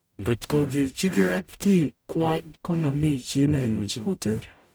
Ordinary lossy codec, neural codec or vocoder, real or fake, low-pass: none; codec, 44.1 kHz, 0.9 kbps, DAC; fake; none